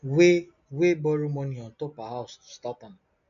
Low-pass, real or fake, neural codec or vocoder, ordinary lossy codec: 7.2 kHz; real; none; none